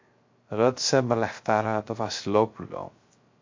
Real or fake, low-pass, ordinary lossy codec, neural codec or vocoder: fake; 7.2 kHz; MP3, 48 kbps; codec, 16 kHz, 0.3 kbps, FocalCodec